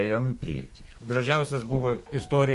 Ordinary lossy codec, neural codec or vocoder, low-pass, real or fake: MP3, 48 kbps; codec, 32 kHz, 1.9 kbps, SNAC; 14.4 kHz; fake